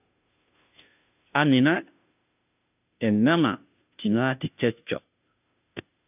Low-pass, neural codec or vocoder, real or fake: 3.6 kHz; codec, 16 kHz, 0.5 kbps, FunCodec, trained on Chinese and English, 25 frames a second; fake